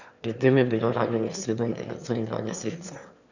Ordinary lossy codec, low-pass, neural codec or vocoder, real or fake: none; 7.2 kHz; autoencoder, 22.05 kHz, a latent of 192 numbers a frame, VITS, trained on one speaker; fake